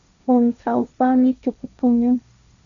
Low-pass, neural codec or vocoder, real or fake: 7.2 kHz; codec, 16 kHz, 1.1 kbps, Voila-Tokenizer; fake